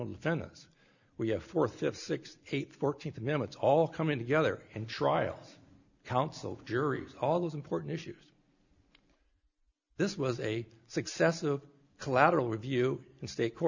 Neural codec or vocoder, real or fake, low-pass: none; real; 7.2 kHz